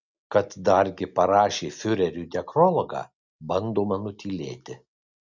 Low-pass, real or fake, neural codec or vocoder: 7.2 kHz; real; none